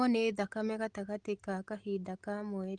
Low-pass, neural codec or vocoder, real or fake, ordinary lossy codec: 9.9 kHz; none; real; Opus, 24 kbps